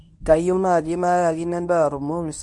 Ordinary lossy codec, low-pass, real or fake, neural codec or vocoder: none; 10.8 kHz; fake; codec, 24 kHz, 0.9 kbps, WavTokenizer, medium speech release version 1